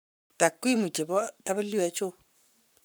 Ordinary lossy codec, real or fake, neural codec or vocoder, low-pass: none; fake; codec, 44.1 kHz, 7.8 kbps, Pupu-Codec; none